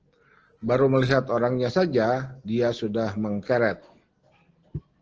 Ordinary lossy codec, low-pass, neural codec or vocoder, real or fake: Opus, 16 kbps; 7.2 kHz; none; real